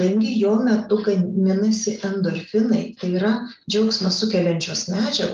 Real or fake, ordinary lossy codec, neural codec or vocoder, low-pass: real; Opus, 32 kbps; none; 7.2 kHz